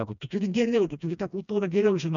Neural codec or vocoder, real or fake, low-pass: codec, 16 kHz, 1 kbps, FreqCodec, smaller model; fake; 7.2 kHz